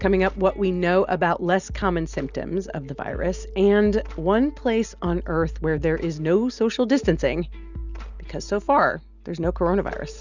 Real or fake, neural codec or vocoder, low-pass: real; none; 7.2 kHz